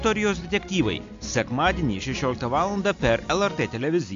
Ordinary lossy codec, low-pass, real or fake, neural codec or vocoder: AAC, 64 kbps; 7.2 kHz; real; none